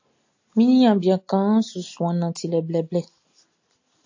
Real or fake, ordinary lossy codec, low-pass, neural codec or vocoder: real; AAC, 48 kbps; 7.2 kHz; none